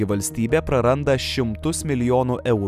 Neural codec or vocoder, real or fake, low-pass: none; real; 14.4 kHz